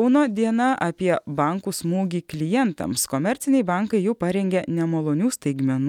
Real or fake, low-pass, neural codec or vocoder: real; 19.8 kHz; none